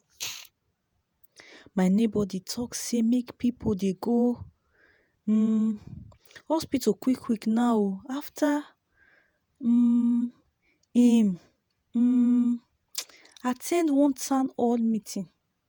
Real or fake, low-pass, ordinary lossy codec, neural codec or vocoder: fake; none; none; vocoder, 48 kHz, 128 mel bands, Vocos